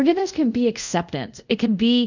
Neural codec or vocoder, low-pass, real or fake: codec, 24 kHz, 0.5 kbps, DualCodec; 7.2 kHz; fake